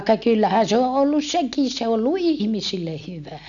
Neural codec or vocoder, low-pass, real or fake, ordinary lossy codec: none; 7.2 kHz; real; none